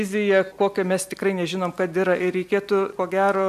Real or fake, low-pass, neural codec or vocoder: real; 14.4 kHz; none